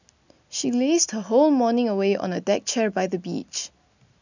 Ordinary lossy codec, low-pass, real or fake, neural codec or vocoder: none; 7.2 kHz; real; none